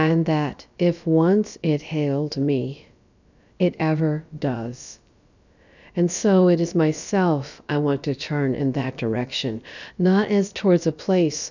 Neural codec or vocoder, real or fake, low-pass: codec, 16 kHz, about 1 kbps, DyCAST, with the encoder's durations; fake; 7.2 kHz